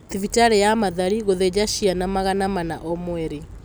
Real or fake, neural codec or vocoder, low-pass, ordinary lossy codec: real; none; none; none